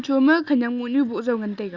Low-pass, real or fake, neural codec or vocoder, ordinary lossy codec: 7.2 kHz; real; none; Opus, 64 kbps